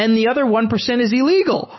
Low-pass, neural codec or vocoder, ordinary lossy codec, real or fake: 7.2 kHz; none; MP3, 24 kbps; real